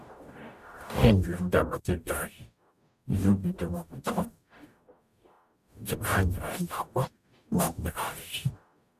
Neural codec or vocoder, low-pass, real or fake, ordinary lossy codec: codec, 44.1 kHz, 0.9 kbps, DAC; 14.4 kHz; fake; none